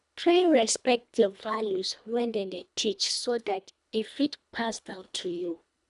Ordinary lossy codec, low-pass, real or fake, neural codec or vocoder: none; 10.8 kHz; fake; codec, 24 kHz, 1.5 kbps, HILCodec